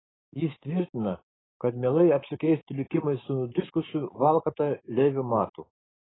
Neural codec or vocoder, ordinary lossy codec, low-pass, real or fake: codec, 16 kHz, 6 kbps, DAC; AAC, 16 kbps; 7.2 kHz; fake